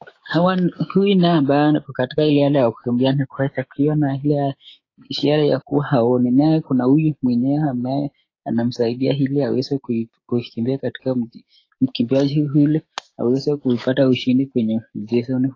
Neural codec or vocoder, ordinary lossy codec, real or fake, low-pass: codec, 16 kHz, 6 kbps, DAC; AAC, 32 kbps; fake; 7.2 kHz